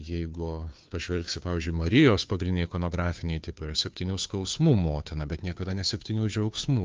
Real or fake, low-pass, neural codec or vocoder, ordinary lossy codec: fake; 7.2 kHz; codec, 16 kHz, 2 kbps, FunCodec, trained on Chinese and English, 25 frames a second; Opus, 24 kbps